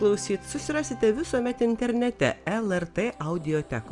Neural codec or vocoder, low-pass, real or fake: none; 10.8 kHz; real